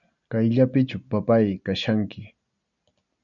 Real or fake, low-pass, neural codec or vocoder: real; 7.2 kHz; none